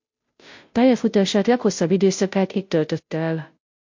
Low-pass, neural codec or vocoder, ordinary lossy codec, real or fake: 7.2 kHz; codec, 16 kHz, 0.5 kbps, FunCodec, trained on Chinese and English, 25 frames a second; MP3, 48 kbps; fake